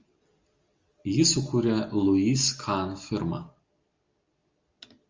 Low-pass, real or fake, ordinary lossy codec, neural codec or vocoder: 7.2 kHz; real; Opus, 32 kbps; none